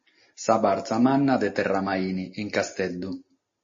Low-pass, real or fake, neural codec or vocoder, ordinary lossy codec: 7.2 kHz; real; none; MP3, 32 kbps